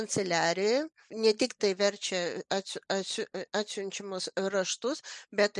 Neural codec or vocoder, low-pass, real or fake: none; 10.8 kHz; real